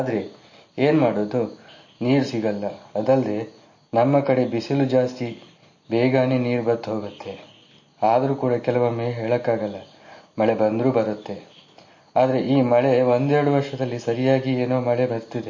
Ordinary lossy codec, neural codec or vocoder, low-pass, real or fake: MP3, 32 kbps; none; 7.2 kHz; real